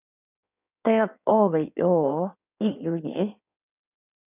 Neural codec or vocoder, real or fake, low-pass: codec, 16 kHz in and 24 kHz out, 1.1 kbps, FireRedTTS-2 codec; fake; 3.6 kHz